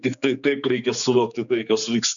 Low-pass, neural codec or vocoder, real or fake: 7.2 kHz; codec, 16 kHz, 4 kbps, X-Codec, HuBERT features, trained on general audio; fake